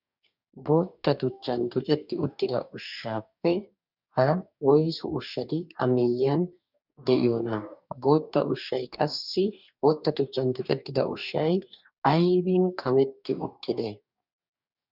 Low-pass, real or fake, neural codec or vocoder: 5.4 kHz; fake; codec, 44.1 kHz, 2.6 kbps, DAC